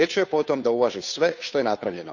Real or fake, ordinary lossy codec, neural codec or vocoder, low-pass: fake; none; codec, 16 kHz, 2 kbps, FunCodec, trained on Chinese and English, 25 frames a second; 7.2 kHz